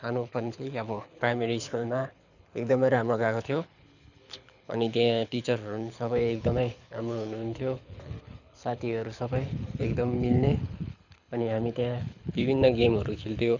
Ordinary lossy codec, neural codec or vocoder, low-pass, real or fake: none; codec, 24 kHz, 6 kbps, HILCodec; 7.2 kHz; fake